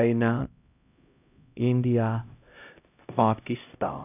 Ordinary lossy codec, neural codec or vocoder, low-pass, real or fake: none; codec, 16 kHz, 0.5 kbps, X-Codec, HuBERT features, trained on LibriSpeech; 3.6 kHz; fake